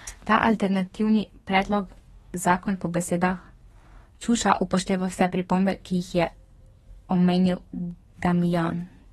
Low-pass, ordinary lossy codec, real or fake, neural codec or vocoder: 14.4 kHz; AAC, 32 kbps; fake; codec, 32 kHz, 1.9 kbps, SNAC